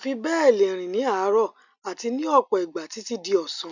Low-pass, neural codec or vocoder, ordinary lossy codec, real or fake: 7.2 kHz; none; none; real